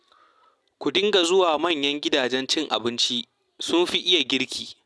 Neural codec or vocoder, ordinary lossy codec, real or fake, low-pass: none; none; real; none